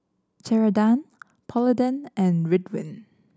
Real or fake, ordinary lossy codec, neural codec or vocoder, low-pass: real; none; none; none